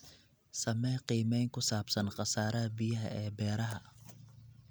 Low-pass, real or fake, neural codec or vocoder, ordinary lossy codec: none; real; none; none